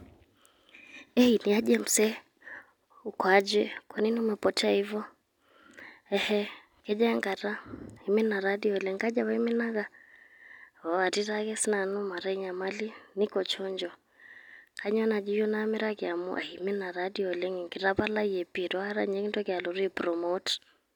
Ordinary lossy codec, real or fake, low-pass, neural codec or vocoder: none; real; 19.8 kHz; none